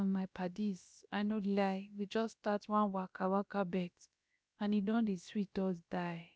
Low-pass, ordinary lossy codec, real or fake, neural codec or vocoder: none; none; fake; codec, 16 kHz, about 1 kbps, DyCAST, with the encoder's durations